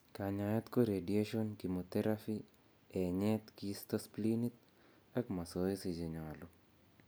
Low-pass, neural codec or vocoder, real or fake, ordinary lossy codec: none; none; real; none